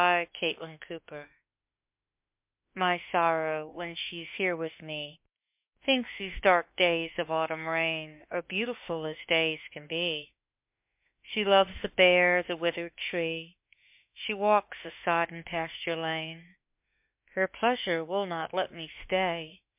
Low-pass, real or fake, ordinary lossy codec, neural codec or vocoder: 3.6 kHz; fake; MP3, 32 kbps; autoencoder, 48 kHz, 32 numbers a frame, DAC-VAE, trained on Japanese speech